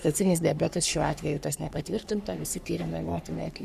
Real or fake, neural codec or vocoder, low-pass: fake; codec, 44.1 kHz, 3.4 kbps, Pupu-Codec; 14.4 kHz